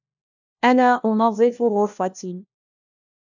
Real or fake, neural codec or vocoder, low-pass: fake; codec, 16 kHz, 1 kbps, FunCodec, trained on LibriTTS, 50 frames a second; 7.2 kHz